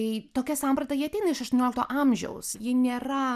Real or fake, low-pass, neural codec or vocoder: real; 14.4 kHz; none